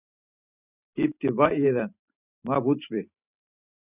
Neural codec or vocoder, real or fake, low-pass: vocoder, 44.1 kHz, 128 mel bands every 512 samples, BigVGAN v2; fake; 3.6 kHz